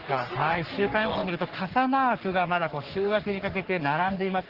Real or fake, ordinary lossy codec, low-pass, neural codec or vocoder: fake; Opus, 16 kbps; 5.4 kHz; codec, 44.1 kHz, 3.4 kbps, Pupu-Codec